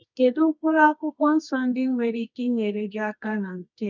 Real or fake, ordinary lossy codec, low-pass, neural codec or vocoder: fake; none; 7.2 kHz; codec, 24 kHz, 0.9 kbps, WavTokenizer, medium music audio release